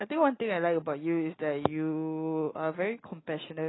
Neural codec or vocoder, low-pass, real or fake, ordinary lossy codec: none; 7.2 kHz; real; AAC, 16 kbps